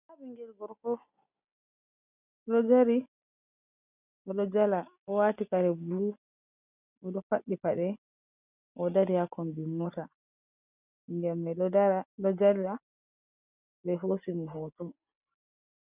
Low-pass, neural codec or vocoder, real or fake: 3.6 kHz; none; real